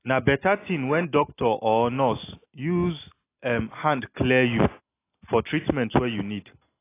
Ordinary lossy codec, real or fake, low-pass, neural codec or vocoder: AAC, 24 kbps; real; 3.6 kHz; none